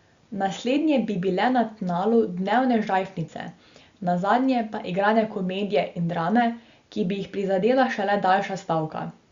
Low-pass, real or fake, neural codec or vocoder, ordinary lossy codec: 7.2 kHz; real; none; Opus, 64 kbps